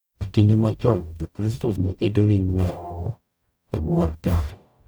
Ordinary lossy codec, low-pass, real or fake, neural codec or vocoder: none; none; fake; codec, 44.1 kHz, 0.9 kbps, DAC